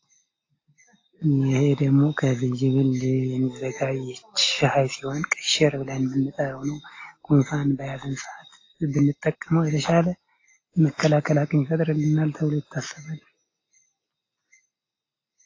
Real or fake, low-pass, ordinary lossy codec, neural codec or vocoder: real; 7.2 kHz; AAC, 32 kbps; none